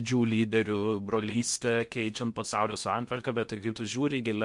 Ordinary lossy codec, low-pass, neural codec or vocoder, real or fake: MP3, 64 kbps; 10.8 kHz; codec, 16 kHz in and 24 kHz out, 0.8 kbps, FocalCodec, streaming, 65536 codes; fake